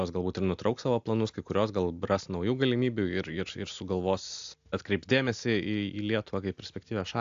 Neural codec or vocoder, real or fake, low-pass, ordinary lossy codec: none; real; 7.2 kHz; AAC, 64 kbps